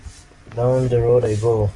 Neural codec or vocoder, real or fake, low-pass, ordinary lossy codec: none; real; 10.8 kHz; AAC, 32 kbps